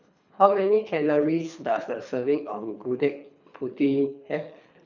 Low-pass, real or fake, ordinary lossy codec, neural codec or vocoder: 7.2 kHz; fake; none; codec, 24 kHz, 3 kbps, HILCodec